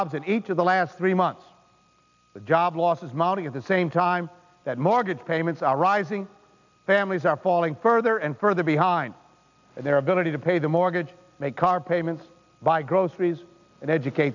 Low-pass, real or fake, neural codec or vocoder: 7.2 kHz; real; none